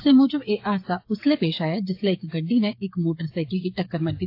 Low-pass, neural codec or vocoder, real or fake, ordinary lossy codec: 5.4 kHz; codec, 16 kHz, 8 kbps, FreqCodec, smaller model; fake; AAC, 32 kbps